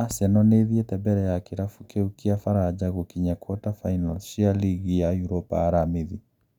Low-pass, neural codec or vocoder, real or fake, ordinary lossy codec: 19.8 kHz; none; real; none